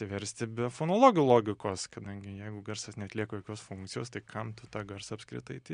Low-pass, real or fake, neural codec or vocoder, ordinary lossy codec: 9.9 kHz; real; none; MP3, 64 kbps